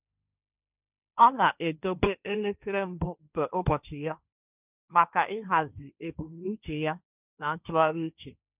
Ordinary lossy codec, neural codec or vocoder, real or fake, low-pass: none; codec, 16 kHz, 1.1 kbps, Voila-Tokenizer; fake; 3.6 kHz